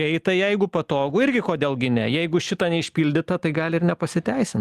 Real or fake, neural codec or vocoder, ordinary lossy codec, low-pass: real; none; Opus, 32 kbps; 14.4 kHz